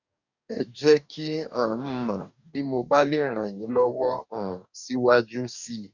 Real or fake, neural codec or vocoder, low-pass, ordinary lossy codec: fake; codec, 44.1 kHz, 2.6 kbps, DAC; 7.2 kHz; none